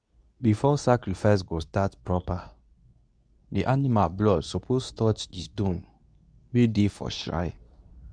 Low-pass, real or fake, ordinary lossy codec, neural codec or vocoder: 9.9 kHz; fake; none; codec, 24 kHz, 0.9 kbps, WavTokenizer, medium speech release version 2